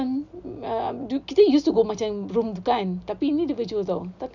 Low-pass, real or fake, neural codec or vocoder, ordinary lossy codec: 7.2 kHz; real; none; none